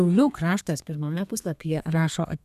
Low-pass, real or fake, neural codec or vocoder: 14.4 kHz; fake; codec, 32 kHz, 1.9 kbps, SNAC